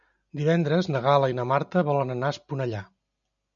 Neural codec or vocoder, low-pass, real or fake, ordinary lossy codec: none; 7.2 kHz; real; MP3, 96 kbps